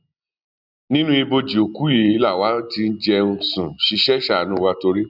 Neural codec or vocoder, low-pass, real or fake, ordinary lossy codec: none; 5.4 kHz; real; none